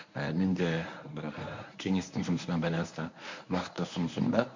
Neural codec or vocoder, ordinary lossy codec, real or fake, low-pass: codec, 24 kHz, 0.9 kbps, WavTokenizer, medium speech release version 1; MP3, 64 kbps; fake; 7.2 kHz